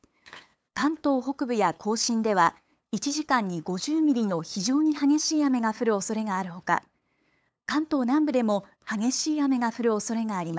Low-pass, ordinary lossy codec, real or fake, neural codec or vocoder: none; none; fake; codec, 16 kHz, 8 kbps, FunCodec, trained on LibriTTS, 25 frames a second